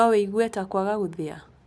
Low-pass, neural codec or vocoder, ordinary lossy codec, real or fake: none; none; none; real